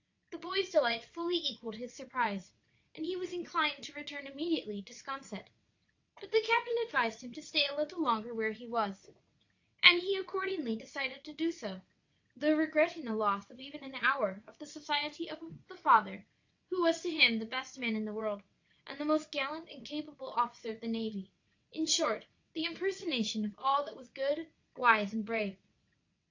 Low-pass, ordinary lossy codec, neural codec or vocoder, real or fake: 7.2 kHz; AAC, 48 kbps; vocoder, 22.05 kHz, 80 mel bands, WaveNeXt; fake